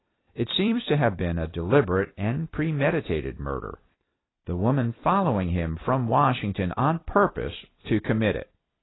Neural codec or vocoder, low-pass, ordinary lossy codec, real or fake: vocoder, 44.1 kHz, 128 mel bands every 256 samples, BigVGAN v2; 7.2 kHz; AAC, 16 kbps; fake